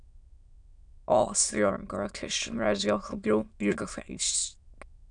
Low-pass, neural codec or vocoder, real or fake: 9.9 kHz; autoencoder, 22.05 kHz, a latent of 192 numbers a frame, VITS, trained on many speakers; fake